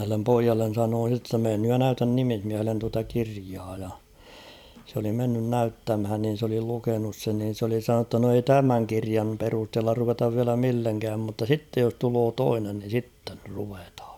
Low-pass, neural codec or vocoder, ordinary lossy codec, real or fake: 19.8 kHz; vocoder, 44.1 kHz, 128 mel bands every 256 samples, BigVGAN v2; none; fake